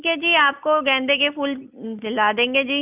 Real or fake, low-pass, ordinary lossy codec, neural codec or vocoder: real; 3.6 kHz; none; none